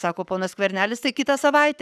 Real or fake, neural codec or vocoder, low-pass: fake; codec, 44.1 kHz, 7.8 kbps, Pupu-Codec; 14.4 kHz